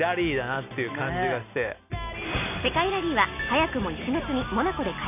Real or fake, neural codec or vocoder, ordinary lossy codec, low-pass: real; none; none; 3.6 kHz